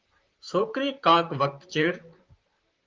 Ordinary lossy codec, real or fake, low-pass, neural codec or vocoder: Opus, 32 kbps; fake; 7.2 kHz; vocoder, 44.1 kHz, 128 mel bands, Pupu-Vocoder